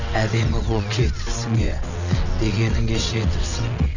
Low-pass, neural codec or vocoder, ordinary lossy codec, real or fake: 7.2 kHz; vocoder, 22.05 kHz, 80 mel bands, WaveNeXt; none; fake